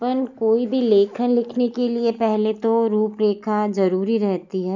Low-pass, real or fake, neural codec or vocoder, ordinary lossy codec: 7.2 kHz; real; none; none